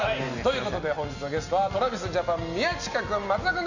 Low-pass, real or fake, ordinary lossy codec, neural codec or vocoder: 7.2 kHz; real; none; none